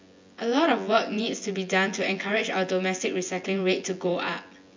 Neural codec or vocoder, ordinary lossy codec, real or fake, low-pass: vocoder, 24 kHz, 100 mel bands, Vocos; MP3, 64 kbps; fake; 7.2 kHz